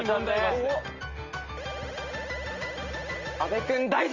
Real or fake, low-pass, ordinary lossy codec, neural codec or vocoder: real; 7.2 kHz; Opus, 32 kbps; none